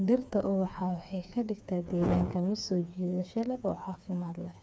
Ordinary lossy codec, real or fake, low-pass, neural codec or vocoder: none; fake; none; codec, 16 kHz, 4 kbps, FreqCodec, smaller model